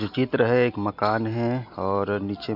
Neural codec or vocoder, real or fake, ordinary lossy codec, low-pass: none; real; none; 5.4 kHz